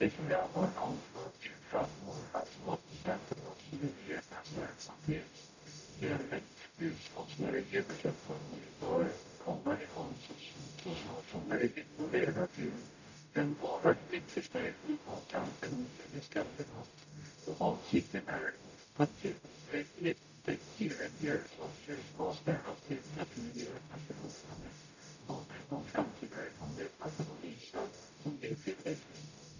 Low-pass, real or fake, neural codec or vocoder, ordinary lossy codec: 7.2 kHz; fake; codec, 44.1 kHz, 0.9 kbps, DAC; none